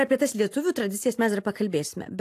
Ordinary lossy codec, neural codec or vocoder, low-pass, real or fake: AAC, 64 kbps; none; 14.4 kHz; real